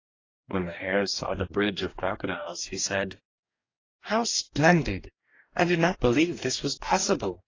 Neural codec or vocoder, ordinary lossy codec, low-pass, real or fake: codec, 44.1 kHz, 2.6 kbps, DAC; AAC, 32 kbps; 7.2 kHz; fake